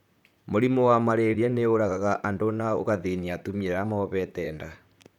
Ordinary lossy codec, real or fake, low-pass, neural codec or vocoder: none; fake; 19.8 kHz; vocoder, 44.1 kHz, 128 mel bands, Pupu-Vocoder